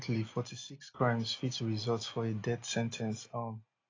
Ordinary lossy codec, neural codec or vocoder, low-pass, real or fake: AAC, 32 kbps; none; 7.2 kHz; real